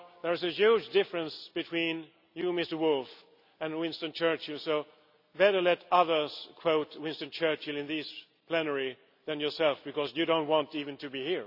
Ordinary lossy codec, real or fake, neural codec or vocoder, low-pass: none; real; none; 5.4 kHz